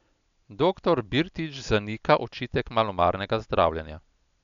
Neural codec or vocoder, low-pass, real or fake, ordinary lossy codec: none; 7.2 kHz; real; none